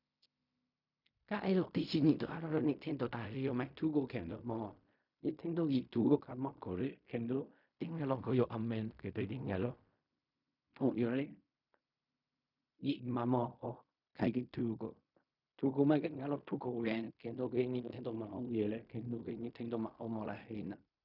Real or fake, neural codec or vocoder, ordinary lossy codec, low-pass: fake; codec, 16 kHz in and 24 kHz out, 0.4 kbps, LongCat-Audio-Codec, fine tuned four codebook decoder; none; 5.4 kHz